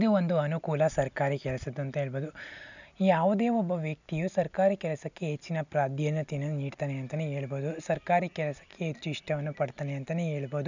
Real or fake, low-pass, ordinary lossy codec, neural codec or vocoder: real; 7.2 kHz; none; none